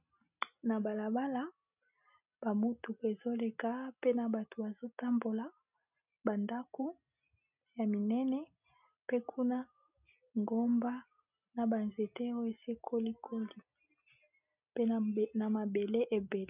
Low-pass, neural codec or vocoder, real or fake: 3.6 kHz; none; real